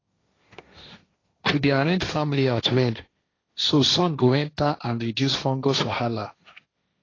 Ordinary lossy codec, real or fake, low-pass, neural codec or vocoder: AAC, 32 kbps; fake; 7.2 kHz; codec, 16 kHz, 1.1 kbps, Voila-Tokenizer